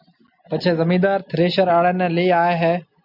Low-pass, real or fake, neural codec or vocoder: 5.4 kHz; real; none